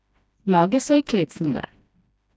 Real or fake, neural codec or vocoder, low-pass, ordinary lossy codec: fake; codec, 16 kHz, 1 kbps, FreqCodec, smaller model; none; none